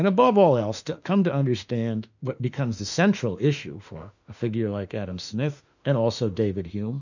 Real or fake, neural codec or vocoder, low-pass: fake; autoencoder, 48 kHz, 32 numbers a frame, DAC-VAE, trained on Japanese speech; 7.2 kHz